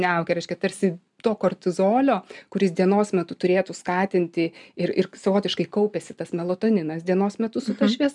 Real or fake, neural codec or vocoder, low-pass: real; none; 10.8 kHz